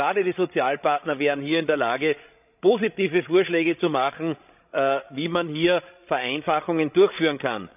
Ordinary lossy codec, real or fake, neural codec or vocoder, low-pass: none; fake; codec, 16 kHz, 16 kbps, FreqCodec, larger model; 3.6 kHz